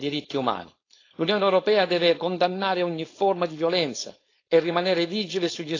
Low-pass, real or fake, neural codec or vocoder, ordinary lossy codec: 7.2 kHz; fake; codec, 16 kHz, 4.8 kbps, FACodec; AAC, 32 kbps